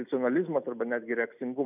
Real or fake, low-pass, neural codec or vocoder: real; 3.6 kHz; none